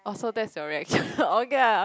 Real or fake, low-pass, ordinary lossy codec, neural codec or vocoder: real; none; none; none